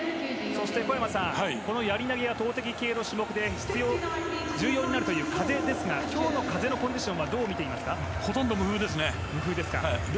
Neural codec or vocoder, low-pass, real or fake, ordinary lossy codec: none; none; real; none